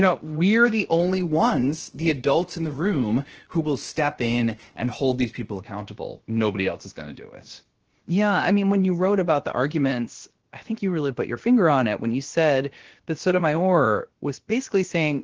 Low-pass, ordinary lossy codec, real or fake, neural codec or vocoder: 7.2 kHz; Opus, 16 kbps; fake; codec, 16 kHz, about 1 kbps, DyCAST, with the encoder's durations